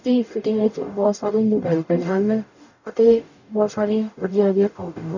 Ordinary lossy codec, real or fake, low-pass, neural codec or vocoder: none; fake; 7.2 kHz; codec, 44.1 kHz, 0.9 kbps, DAC